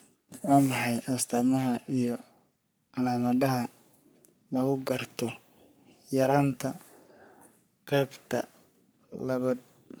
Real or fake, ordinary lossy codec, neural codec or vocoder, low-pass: fake; none; codec, 44.1 kHz, 3.4 kbps, Pupu-Codec; none